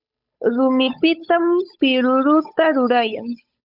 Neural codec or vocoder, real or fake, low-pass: codec, 16 kHz, 8 kbps, FunCodec, trained on Chinese and English, 25 frames a second; fake; 5.4 kHz